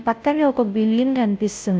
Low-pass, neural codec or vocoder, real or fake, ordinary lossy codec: none; codec, 16 kHz, 0.5 kbps, FunCodec, trained on Chinese and English, 25 frames a second; fake; none